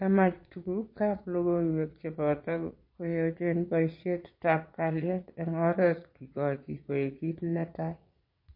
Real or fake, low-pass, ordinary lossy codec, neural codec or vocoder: fake; 5.4 kHz; MP3, 32 kbps; vocoder, 22.05 kHz, 80 mel bands, Vocos